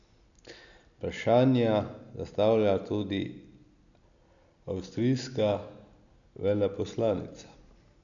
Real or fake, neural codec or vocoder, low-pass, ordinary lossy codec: real; none; 7.2 kHz; none